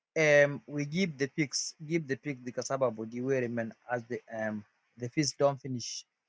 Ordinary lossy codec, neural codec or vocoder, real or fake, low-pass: none; none; real; none